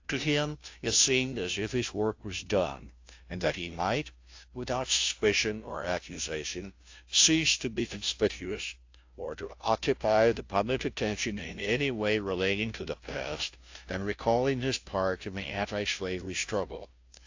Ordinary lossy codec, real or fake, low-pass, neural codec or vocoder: AAC, 48 kbps; fake; 7.2 kHz; codec, 16 kHz, 0.5 kbps, FunCodec, trained on Chinese and English, 25 frames a second